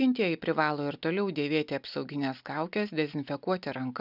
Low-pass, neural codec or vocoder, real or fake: 5.4 kHz; none; real